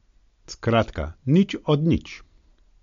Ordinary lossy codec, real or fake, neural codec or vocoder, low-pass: MP3, 48 kbps; real; none; 7.2 kHz